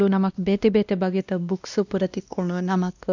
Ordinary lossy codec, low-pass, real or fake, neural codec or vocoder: none; 7.2 kHz; fake; codec, 16 kHz, 1 kbps, X-Codec, WavLM features, trained on Multilingual LibriSpeech